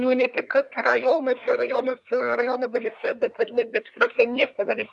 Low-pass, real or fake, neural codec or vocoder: 10.8 kHz; fake; codec, 24 kHz, 1 kbps, SNAC